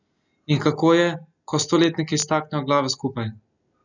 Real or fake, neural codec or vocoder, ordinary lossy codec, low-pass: real; none; none; 7.2 kHz